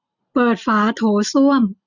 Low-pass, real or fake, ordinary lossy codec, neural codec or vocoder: 7.2 kHz; real; none; none